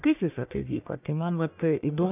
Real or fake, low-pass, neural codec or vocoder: fake; 3.6 kHz; codec, 44.1 kHz, 1.7 kbps, Pupu-Codec